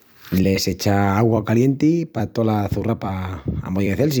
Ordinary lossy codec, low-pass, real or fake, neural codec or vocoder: none; none; fake; vocoder, 44.1 kHz, 128 mel bands every 256 samples, BigVGAN v2